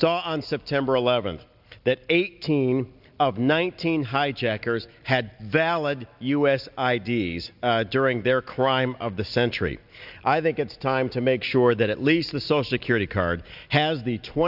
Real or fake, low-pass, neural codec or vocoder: real; 5.4 kHz; none